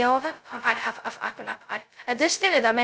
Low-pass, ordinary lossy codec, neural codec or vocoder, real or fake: none; none; codec, 16 kHz, 0.2 kbps, FocalCodec; fake